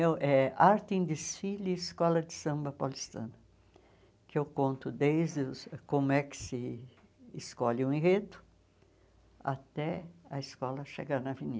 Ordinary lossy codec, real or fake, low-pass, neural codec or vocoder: none; real; none; none